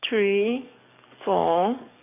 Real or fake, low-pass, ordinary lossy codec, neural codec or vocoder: fake; 3.6 kHz; none; codec, 44.1 kHz, 7.8 kbps, DAC